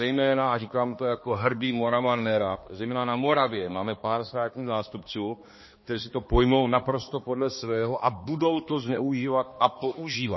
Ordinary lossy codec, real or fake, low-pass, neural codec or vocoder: MP3, 24 kbps; fake; 7.2 kHz; codec, 16 kHz, 2 kbps, X-Codec, HuBERT features, trained on balanced general audio